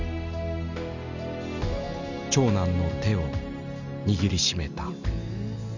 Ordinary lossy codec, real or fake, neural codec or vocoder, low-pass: none; real; none; 7.2 kHz